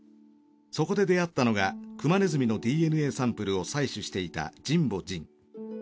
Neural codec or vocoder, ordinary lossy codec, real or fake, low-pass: none; none; real; none